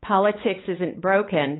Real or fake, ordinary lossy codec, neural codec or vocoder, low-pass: fake; AAC, 16 kbps; codec, 16 kHz, 8 kbps, FunCodec, trained on LibriTTS, 25 frames a second; 7.2 kHz